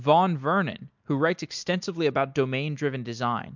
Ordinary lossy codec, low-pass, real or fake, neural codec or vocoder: MP3, 64 kbps; 7.2 kHz; real; none